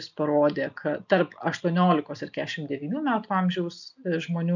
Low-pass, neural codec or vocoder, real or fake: 7.2 kHz; none; real